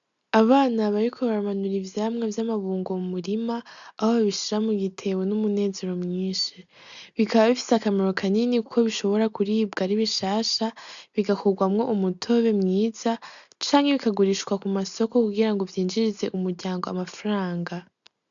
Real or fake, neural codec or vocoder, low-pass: real; none; 7.2 kHz